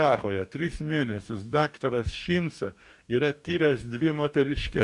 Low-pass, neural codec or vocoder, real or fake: 10.8 kHz; codec, 44.1 kHz, 2.6 kbps, DAC; fake